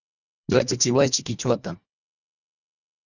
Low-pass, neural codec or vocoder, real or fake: 7.2 kHz; codec, 24 kHz, 1.5 kbps, HILCodec; fake